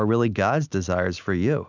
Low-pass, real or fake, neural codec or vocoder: 7.2 kHz; real; none